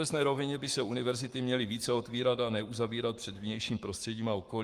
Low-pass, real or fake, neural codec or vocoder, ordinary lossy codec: 14.4 kHz; fake; codec, 44.1 kHz, 7.8 kbps, Pupu-Codec; Opus, 32 kbps